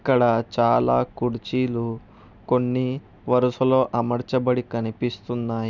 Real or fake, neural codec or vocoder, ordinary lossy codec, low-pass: real; none; none; 7.2 kHz